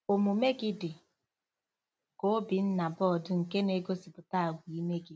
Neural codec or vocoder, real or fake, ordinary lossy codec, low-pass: none; real; none; none